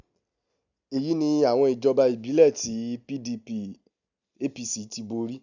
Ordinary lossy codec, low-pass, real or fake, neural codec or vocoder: none; 7.2 kHz; real; none